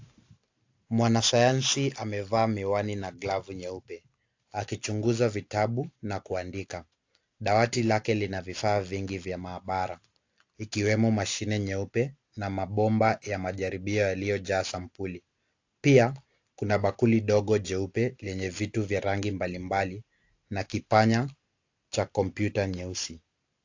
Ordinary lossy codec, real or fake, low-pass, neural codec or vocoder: AAC, 48 kbps; real; 7.2 kHz; none